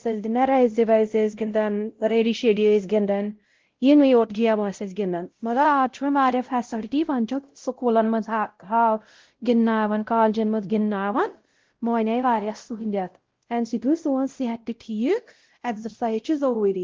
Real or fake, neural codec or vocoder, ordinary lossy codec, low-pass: fake; codec, 16 kHz, 0.5 kbps, X-Codec, WavLM features, trained on Multilingual LibriSpeech; Opus, 16 kbps; 7.2 kHz